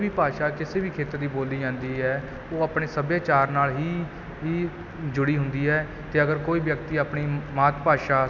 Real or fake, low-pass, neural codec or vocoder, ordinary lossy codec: real; none; none; none